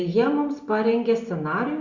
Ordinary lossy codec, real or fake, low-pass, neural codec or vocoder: Opus, 64 kbps; real; 7.2 kHz; none